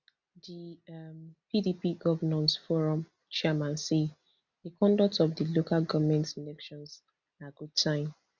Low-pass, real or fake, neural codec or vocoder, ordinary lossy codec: 7.2 kHz; real; none; none